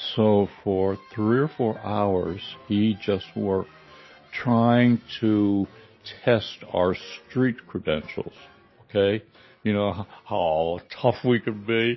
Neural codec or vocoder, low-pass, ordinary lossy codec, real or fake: none; 7.2 kHz; MP3, 24 kbps; real